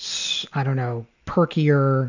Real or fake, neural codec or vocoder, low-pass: real; none; 7.2 kHz